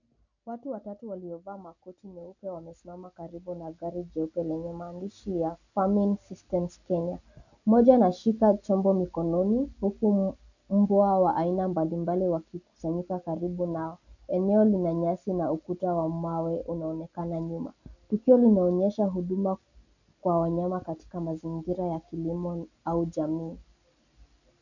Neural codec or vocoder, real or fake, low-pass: none; real; 7.2 kHz